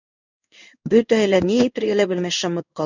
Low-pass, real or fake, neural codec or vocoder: 7.2 kHz; fake; codec, 16 kHz in and 24 kHz out, 1 kbps, XY-Tokenizer